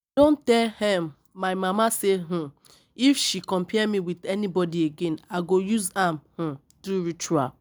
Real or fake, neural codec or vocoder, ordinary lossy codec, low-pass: real; none; none; none